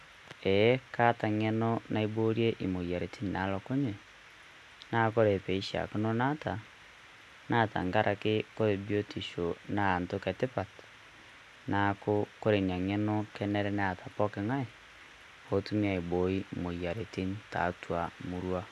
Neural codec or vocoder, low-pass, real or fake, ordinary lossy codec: none; 14.4 kHz; real; none